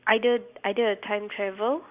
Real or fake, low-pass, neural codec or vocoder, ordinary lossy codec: real; 3.6 kHz; none; Opus, 64 kbps